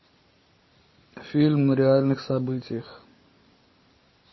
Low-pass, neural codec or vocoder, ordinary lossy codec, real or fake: 7.2 kHz; none; MP3, 24 kbps; real